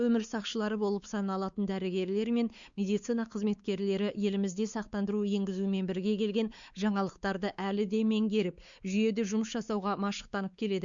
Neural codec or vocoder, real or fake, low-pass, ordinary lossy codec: codec, 16 kHz, 4 kbps, X-Codec, WavLM features, trained on Multilingual LibriSpeech; fake; 7.2 kHz; Opus, 64 kbps